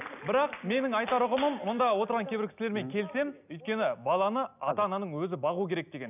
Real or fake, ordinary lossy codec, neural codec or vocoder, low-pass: real; none; none; 3.6 kHz